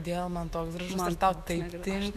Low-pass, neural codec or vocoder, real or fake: 14.4 kHz; autoencoder, 48 kHz, 128 numbers a frame, DAC-VAE, trained on Japanese speech; fake